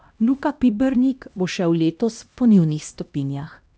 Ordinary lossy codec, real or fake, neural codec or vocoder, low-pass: none; fake; codec, 16 kHz, 1 kbps, X-Codec, HuBERT features, trained on LibriSpeech; none